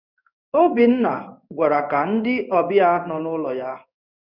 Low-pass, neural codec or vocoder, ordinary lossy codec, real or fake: 5.4 kHz; codec, 16 kHz in and 24 kHz out, 1 kbps, XY-Tokenizer; none; fake